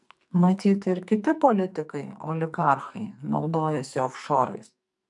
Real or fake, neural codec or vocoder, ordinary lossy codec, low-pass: fake; codec, 44.1 kHz, 2.6 kbps, SNAC; MP3, 96 kbps; 10.8 kHz